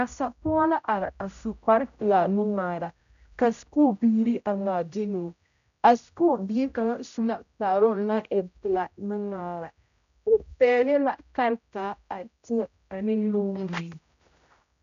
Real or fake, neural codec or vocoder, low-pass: fake; codec, 16 kHz, 0.5 kbps, X-Codec, HuBERT features, trained on general audio; 7.2 kHz